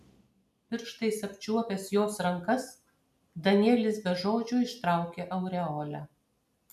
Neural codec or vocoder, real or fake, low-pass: none; real; 14.4 kHz